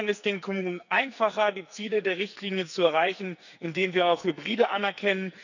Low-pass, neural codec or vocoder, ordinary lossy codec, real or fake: 7.2 kHz; codec, 44.1 kHz, 2.6 kbps, SNAC; none; fake